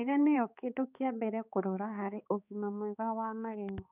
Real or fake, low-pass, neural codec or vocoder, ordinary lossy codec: fake; 3.6 kHz; codec, 16 kHz, 4 kbps, X-Codec, HuBERT features, trained on balanced general audio; none